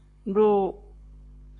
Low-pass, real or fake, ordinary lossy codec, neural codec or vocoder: 10.8 kHz; fake; AAC, 48 kbps; codec, 44.1 kHz, 7.8 kbps, Pupu-Codec